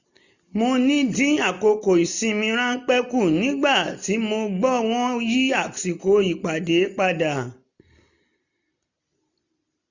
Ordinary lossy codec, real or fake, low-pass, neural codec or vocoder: none; real; 7.2 kHz; none